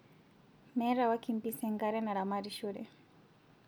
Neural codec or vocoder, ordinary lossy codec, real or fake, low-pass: none; none; real; none